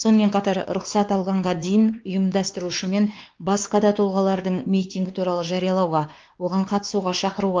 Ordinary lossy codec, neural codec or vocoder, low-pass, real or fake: Opus, 24 kbps; codec, 16 kHz, 2 kbps, X-Codec, WavLM features, trained on Multilingual LibriSpeech; 7.2 kHz; fake